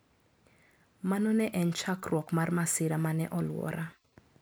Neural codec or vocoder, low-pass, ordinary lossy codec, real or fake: none; none; none; real